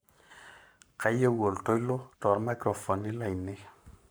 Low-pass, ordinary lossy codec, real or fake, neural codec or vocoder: none; none; fake; codec, 44.1 kHz, 7.8 kbps, Pupu-Codec